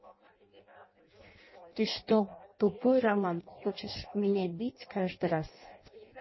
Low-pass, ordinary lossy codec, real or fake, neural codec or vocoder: 7.2 kHz; MP3, 24 kbps; fake; codec, 16 kHz in and 24 kHz out, 0.6 kbps, FireRedTTS-2 codec